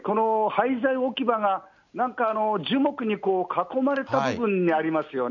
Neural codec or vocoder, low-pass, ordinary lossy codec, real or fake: none; 7.2 kHz; none; real